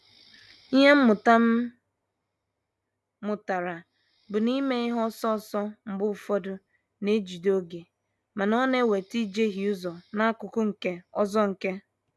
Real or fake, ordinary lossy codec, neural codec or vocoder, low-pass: real; none; none; none